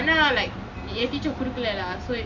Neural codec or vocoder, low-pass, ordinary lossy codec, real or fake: none; 7.2 kHz; none; real